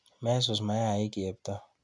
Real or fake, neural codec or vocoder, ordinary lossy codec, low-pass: real; none; none; 10.8 kHz